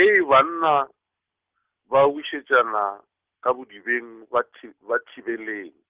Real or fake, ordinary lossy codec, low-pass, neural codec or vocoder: real; Opus, 16 kbps; 3.6 kHz; none